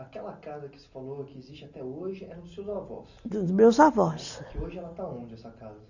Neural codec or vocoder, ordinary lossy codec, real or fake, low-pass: none; none; real; 7.2 kHz